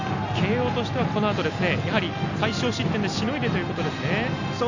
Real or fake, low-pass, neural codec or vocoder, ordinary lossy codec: real; 7.2 kHz; none; none